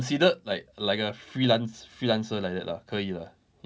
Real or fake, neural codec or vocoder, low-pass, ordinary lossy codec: real; none; none; none